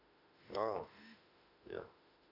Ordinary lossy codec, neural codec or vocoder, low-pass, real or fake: none; autoencoder, 48 kHz, 32 numbers a frame, DAC-VAE, trained on Japanese speech; 5.4 kHz; fake